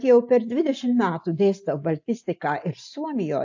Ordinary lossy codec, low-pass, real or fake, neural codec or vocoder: AAC, 48 kbps; 7.2 kHz; real; none